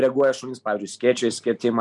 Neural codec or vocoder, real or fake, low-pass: none; real; 10.8 kHz